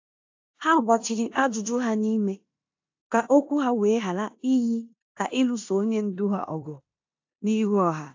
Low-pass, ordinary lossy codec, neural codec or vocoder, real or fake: 7.2 kHz; AAC, 48 kbps; codec, 16 kHz in and 24 kHz out, 0.9 kbps, LongCat-Audio-Codec, four codebook decoder; fake